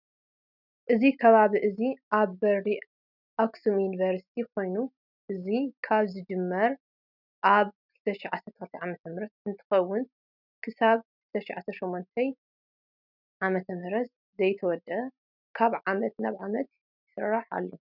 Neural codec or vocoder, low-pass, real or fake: none; 5.4 kHz; real